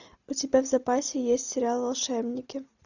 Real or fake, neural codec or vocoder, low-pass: real; none; 7.2 kHz